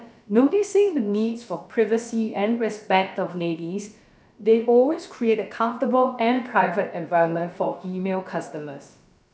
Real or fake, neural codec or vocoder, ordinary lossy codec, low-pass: fake; codec, 16 kHz, about 1 kbps, DyCAST, with the encoder's durations; none; none